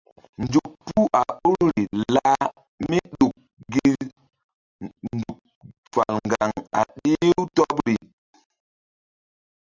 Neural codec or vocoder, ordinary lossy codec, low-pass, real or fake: none; Opus, 64 kbps; 7.2 kHz; real